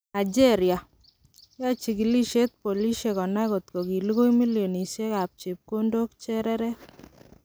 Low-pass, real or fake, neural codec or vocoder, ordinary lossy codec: none; real; none; none